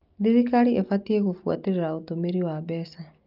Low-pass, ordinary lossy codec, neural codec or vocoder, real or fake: 5.4 kHz; Opus, 24 kbps; none; real